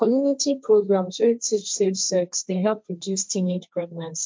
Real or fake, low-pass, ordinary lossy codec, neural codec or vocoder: fake; none; none; codec, 16 kHz, 1.1 kbps, Voila-Tokenizer